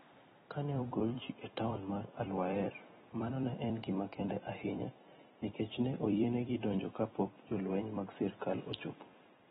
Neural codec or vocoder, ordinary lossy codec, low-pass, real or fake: vocoder, 44.1 kHz, 128 mel bands every 256 samples, BigVGAN v2; AAC, 16 kbps; 19.8 kHz; fake